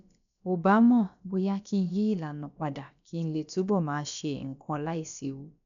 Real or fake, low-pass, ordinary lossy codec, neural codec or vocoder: fake; 7.2 kHz; none; codec, 16 kHz, about 1 kbps, DyCAST, with the encoder's durations